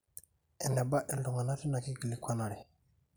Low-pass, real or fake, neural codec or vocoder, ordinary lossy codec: none; real; none; none